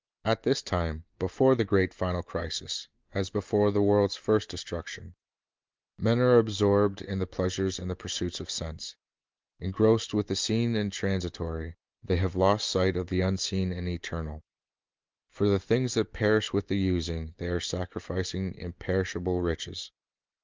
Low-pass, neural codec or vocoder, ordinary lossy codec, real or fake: 7.2 kHz; none; Opus, 32 kbps; real